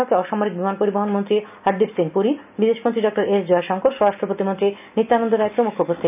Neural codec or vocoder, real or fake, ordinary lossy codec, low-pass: none; real; none; 3.6 kHz